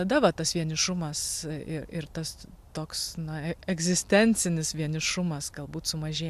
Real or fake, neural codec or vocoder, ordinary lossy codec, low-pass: real; none; AAC, 96 kbps; 14.4 kHz